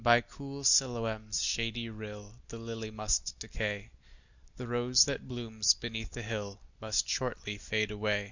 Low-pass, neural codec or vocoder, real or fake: 7.2 kHz; none; real